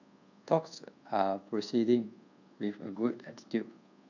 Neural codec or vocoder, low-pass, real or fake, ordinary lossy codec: codec, 24 kHz, 1.2 kbps, DualCodec; 7.2 kHz; fake; none